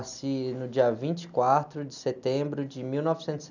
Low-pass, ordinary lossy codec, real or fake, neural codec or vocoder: 7.2 kHz; none; real; none